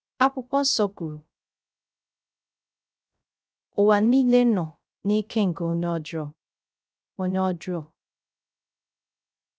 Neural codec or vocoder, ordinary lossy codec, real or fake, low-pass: codec, 16 kHz, 0.3 kbps, FocalCodec; none; fake; none